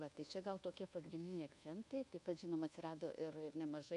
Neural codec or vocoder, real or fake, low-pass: codec, 24 kHz, 1.2 kbps, DualCodec; fake; 10.8 kHz